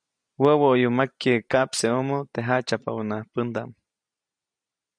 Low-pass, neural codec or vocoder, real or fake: 9.9 kHz; none; real